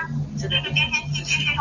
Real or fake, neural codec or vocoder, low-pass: real; none; 7.2 kHz